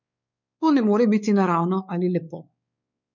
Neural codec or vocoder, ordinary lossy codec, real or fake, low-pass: codec, 16 kHz, 4 kbps, X-Codec, WavLM features, trained on Multilingual LibriSpeech; none; fake; 7.2 kHz